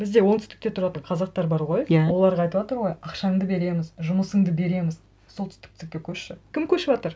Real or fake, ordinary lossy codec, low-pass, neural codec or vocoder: real; none; none; none